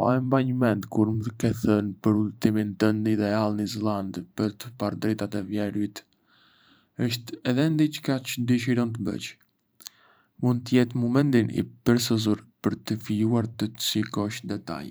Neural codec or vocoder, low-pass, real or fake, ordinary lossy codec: vocoder, 44.1 kHz, 128 mel bands every 512 samples, BigVGAN v2; none; fake; none